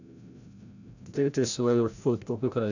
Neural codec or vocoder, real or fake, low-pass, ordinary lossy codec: codec, 16 kHz, 0.5 kbps, FreqCodec, larger model; fake; 7.2 kHz; none